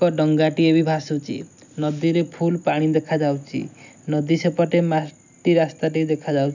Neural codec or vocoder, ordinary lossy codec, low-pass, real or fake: none; none; 7.2 kHz; real